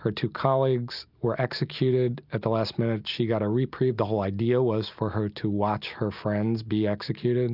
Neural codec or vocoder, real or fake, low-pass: none; real; 5.4 kHz